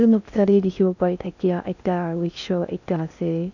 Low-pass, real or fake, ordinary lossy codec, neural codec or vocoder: 7.2 kHz; fake; none; codec, 16 kHz in and 24 kHz out, 0.6 kbps, FocalCodec, streaming, 4096 codes